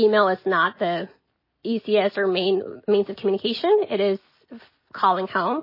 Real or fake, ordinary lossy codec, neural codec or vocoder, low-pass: real; MP3, 24 kbps; none; 5.4 kHz